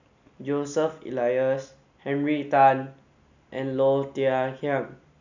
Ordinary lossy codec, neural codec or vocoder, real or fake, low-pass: none; none; real; 7.2 kHz